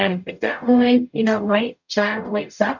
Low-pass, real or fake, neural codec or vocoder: 7.2 kHz; fake; codec, 44.1 kHz, 0.9 kbps, DAC